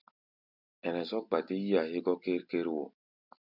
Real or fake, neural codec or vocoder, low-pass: real; none; 5.4 kHz